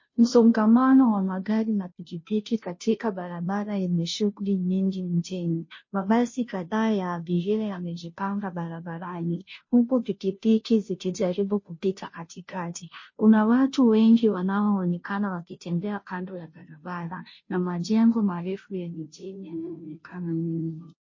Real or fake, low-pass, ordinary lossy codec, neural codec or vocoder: fake; 7.2 kHz; MP3, 32 kbps; codec, 16 kHz, 0.5 kbps, FunCodec, trained on Chinese and English, 25 frames a second